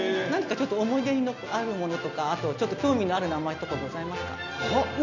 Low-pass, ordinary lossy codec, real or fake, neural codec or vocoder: 7.2 kHz; none; real; none